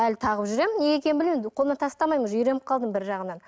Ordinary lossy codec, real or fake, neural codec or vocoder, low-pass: none; real; none; none